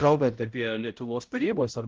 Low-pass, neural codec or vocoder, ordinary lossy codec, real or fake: 7.2 kHz; codec, 16 kHz, 0.5 kbps, X-Codec, HuBERT features, trained on balanced general audio; Opus, 16 kbps; fake